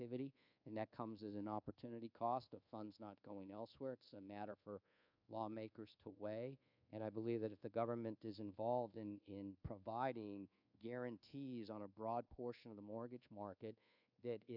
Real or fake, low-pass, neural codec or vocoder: fake; 5.4 kHz; codec, 24 kHz, 1.2 kbps, DualCodec